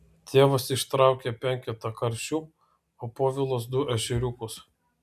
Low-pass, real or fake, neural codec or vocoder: 14.4 kHz; real; none